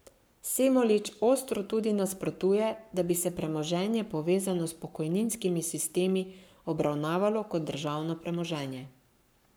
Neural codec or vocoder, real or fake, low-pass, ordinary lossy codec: codec, 44.1 kHz, 7.8 kbps, Pupu-Codec; fake; none; none